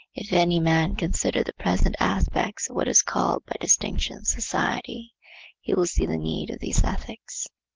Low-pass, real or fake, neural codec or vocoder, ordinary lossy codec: 7.2 kHz; real; none; Opus, 16 kbps